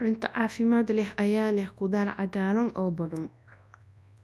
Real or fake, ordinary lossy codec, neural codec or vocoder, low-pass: fake; none; codec, 24 kHz, 0.9 kbps, WavTokenizer, large speech release; none